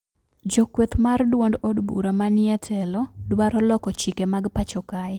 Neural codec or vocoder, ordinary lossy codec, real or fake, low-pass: none; Opus, 24 kbps; real; 19.8 kHz